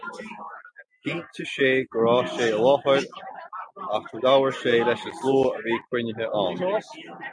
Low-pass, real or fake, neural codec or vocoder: 9.9 kHz; real; none